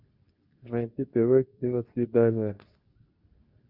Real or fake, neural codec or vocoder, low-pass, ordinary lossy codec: fake; codec, 24 kHz, 0.9 kbps, WavTokenizer, medium speech release version 2; 5.4 kHz; Opus, 64 kbps